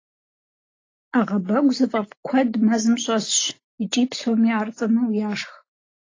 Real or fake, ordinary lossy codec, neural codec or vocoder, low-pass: real; AAC, 32 kbps; none; 7.2 kHz